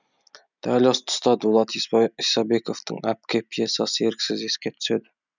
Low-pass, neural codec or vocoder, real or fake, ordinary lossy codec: 7.2 kHz; none; real; none